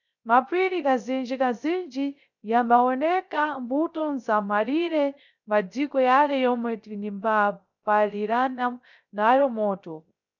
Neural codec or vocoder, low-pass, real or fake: codec, 16 kHz, 0.3 kbps, FocalCodec; 7.2 kHz; fake